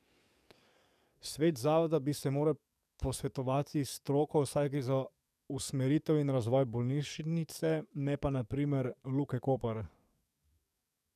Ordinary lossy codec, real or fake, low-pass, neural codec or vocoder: none; fake; 14.4 kHz; codec, 44.1 kHz, 7.8 kbps, DAC